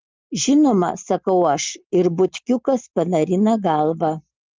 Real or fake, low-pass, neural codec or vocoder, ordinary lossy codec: real; 7.2 kHz; none; Opus, 32 kbps